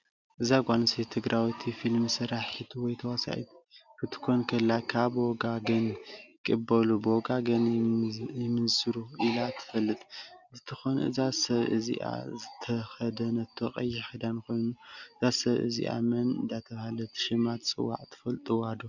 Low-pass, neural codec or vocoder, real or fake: 7.2 kHz; none; real